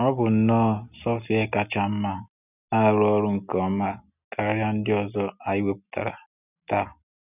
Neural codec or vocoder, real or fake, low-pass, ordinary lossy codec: none; real; 3.6 kHz; none